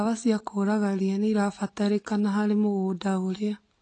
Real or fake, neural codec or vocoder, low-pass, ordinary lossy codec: real; none; 9.9 kHz; AAC, 32 kbps